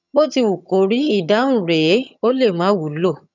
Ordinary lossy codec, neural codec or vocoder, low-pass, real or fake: none; vocoder, 22.05 kHz, 80 mel bands, HiFi-GAN; 7.2 kHz; fake